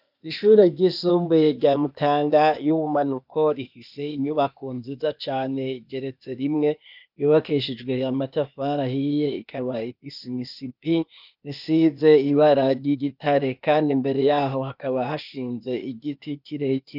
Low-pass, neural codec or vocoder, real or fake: 5.4 kHz; codec, 16 kHz, 0.8 kbps, ZipCodec; fake